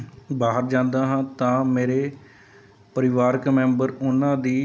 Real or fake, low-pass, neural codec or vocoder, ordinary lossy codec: real; none; none; none